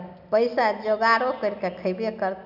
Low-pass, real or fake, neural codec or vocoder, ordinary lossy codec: 5.4 kHz; real; none; none